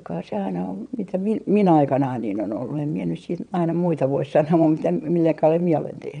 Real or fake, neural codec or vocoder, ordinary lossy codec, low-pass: fake; vocoder, 22.05 kHz, 80 mel bands, WaveNeXt; none; 9.9 kHz